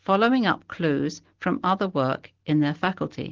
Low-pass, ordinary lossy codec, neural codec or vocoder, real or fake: 7.2 kHz; Opus, 16 kbps; none; real